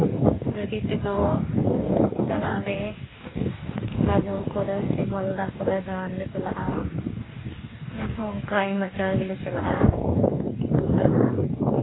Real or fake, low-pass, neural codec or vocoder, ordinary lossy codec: fake; 7.2 kHz; codec, 44.1 kHz, 2.6 kbps, DAC; AAC, 16 kbps